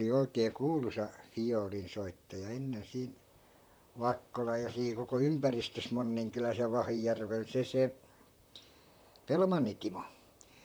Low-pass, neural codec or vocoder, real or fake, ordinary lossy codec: none; vocoder, 44.1 kHz, 128 mel bands, Pupu-Vocoder; fake; none